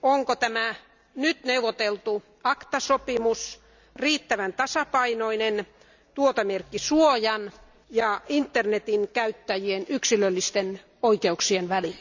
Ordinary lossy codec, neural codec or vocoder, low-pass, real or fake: none; none; 7.2 kHz; real